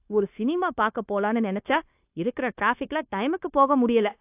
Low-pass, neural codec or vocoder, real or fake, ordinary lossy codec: 3.6 kHz; codec, 16 kHz, 0.9 kbps, LongCat-Audio-Codec; fake; AAC, 32 kbps